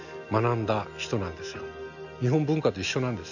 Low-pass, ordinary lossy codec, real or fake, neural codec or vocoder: 7.2 kHz; none; real; none